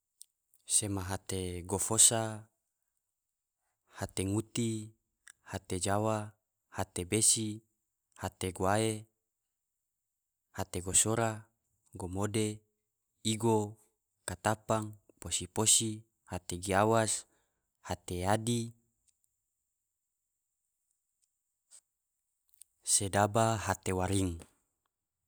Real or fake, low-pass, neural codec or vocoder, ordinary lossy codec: real; none; none; none